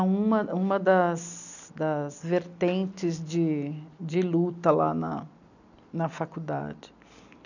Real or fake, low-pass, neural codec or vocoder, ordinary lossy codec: real; 7.2 kHz; none; none